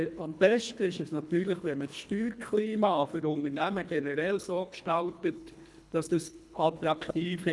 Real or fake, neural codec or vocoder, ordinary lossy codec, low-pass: fake; codec, 24 kHz, 1.5 kbps, HILCodec; none; none